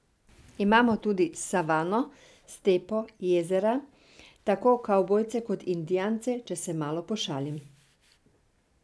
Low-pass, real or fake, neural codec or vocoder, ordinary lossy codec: none; real; none; none